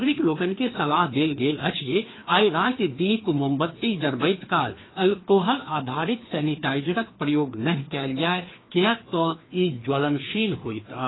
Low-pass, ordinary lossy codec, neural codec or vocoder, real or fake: 7.2 kHz; AAC, 16 kbps; codec, 16 kHz, 2 kbps, FreqCodec, larger model; fake